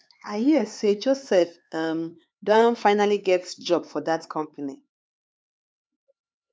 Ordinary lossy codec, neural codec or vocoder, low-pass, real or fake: none; codec, 16 kHz, 4 kbps, X-Codec, HuBERT features, trained on LibriSpeech; none; fake